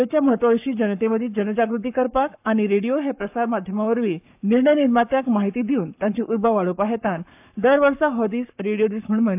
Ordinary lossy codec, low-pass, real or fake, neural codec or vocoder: AAC, 32 kbps; 3.6 kHz; fake; codec, 44.1 kHz, 7.8 kbps, Pupu-Codec